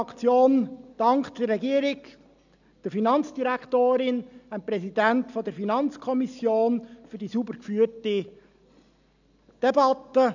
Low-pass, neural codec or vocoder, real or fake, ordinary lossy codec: 7.2 kHz; none; real; none